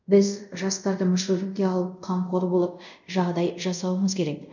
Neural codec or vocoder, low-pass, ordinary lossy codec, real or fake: codec, 24 kHz, 0.5 kbps, DualCodec; 7.2 kHz; none; fake